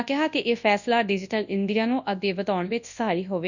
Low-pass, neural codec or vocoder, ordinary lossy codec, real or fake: 7.2 kHz; codec, 24 kHz, 0.9 kbps, WavTokenizer, large speech release; none; fake